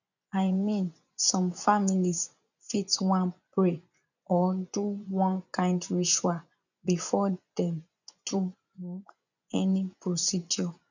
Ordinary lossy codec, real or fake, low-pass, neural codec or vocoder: none; fake; 7.2 kHz; vocoder, 24 kHz, 100 mel bands, Vocos